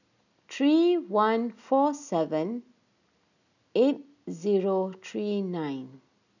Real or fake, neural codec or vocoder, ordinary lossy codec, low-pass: real; none; none; 7.2 kHz